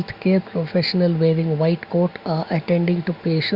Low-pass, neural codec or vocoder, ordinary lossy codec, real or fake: 5.4 kHz; none; Opus, 64 kbps; real